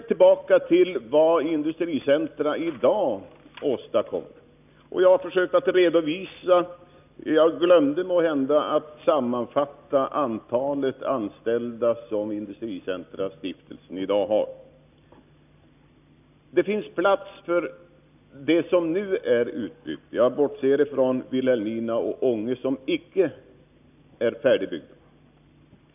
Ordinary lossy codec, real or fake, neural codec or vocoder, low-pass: none; real; none; 3.6 kHz